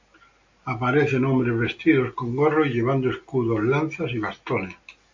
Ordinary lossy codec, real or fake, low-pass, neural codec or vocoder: AAC, 48 kbps; real; 7.2 kHz; none